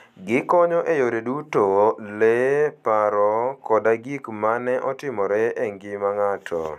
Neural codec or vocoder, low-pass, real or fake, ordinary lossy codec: none; 14.4 kHz; real; none